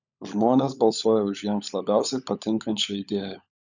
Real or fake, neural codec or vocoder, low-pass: fake; codec, 16 kHz, 16 kbps, FunCodec, trained on LibriTTS, 50 frames a second; 7.2 kHz